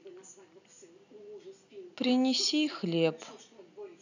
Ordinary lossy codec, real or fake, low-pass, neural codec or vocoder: none; real; 7.2 kHz; none